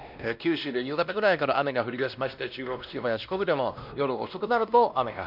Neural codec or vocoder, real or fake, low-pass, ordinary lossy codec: codec, 16 kHz, 1 kbps, X-Codec, WavLM features, trained on Multilingual LibriSpeech; fake; 5.4 kHz; none